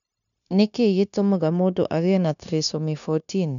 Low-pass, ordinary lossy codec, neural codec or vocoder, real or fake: 7.2 kHz; none; codec, 16 kHz, 0.9 kbps, LongCat-Audio-Codec; fake